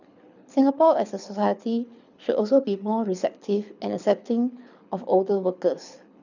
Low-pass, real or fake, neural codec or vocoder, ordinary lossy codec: 7.2 kHz; fake; codec, 24 kHz, 6 kbps, HILCodec; none